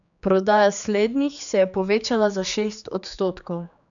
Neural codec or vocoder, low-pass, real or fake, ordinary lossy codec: codec, 16 kHz, 4 kbps, X-Codec, HuBERT features, trained on general audio; 7.2 kHz; fake; none